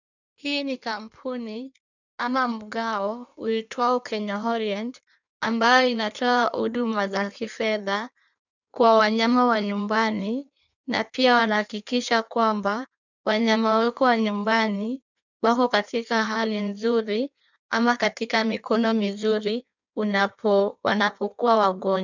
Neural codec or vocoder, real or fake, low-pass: codec, 16 kHz in and 24 kHz out, 1.1 kbps, FireRedTTS-2 codec; fake; 7.2 kHz